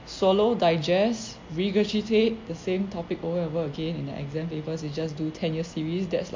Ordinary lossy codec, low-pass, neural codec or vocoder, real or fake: MP3, 48 kbps; 7.2 kHz; none; real